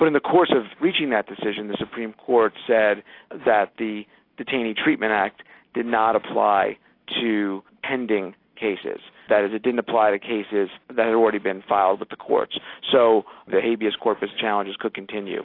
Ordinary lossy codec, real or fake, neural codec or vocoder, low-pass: AAC, 32 kbps; real; none; 5.4 kHz